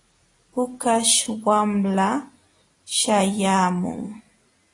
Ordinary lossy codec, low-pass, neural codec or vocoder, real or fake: AAC, 32 kbps; 10.8 kHz; none; real